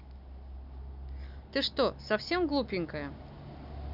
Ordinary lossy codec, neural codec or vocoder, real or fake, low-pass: none; none; real; 5.4 kHz